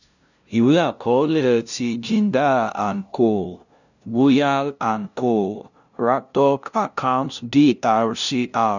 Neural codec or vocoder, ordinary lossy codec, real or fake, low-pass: codec, 16 kHz, 0.5 kbps, FunCodec, trained on LibriTTS, 25 frames a second; none; fake; 7.2 kHz